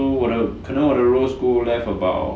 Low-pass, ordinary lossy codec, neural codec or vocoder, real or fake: none; none; none; real